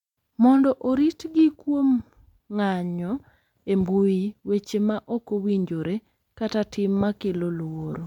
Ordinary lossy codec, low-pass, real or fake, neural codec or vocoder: MP3, 96 kbps; 19.8 kHz; real; none